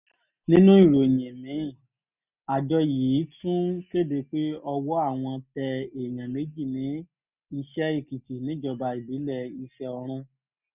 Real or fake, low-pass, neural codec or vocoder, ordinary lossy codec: real; 3.6 kHz; none; none